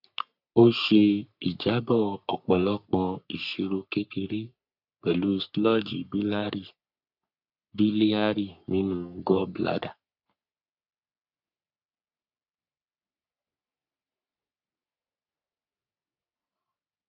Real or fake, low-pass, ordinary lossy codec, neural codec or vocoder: fake; 5.4 kHz; none; codec, 44.1 kHz, 3.4 kbps, Pupu-Codec